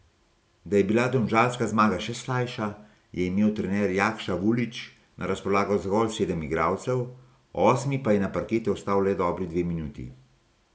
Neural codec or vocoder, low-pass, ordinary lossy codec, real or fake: none; none; none; real